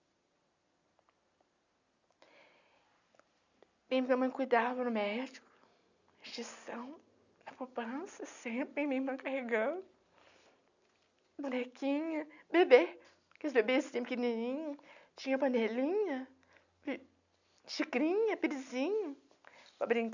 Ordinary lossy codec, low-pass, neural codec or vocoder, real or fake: none; 7.2 kHz; none; real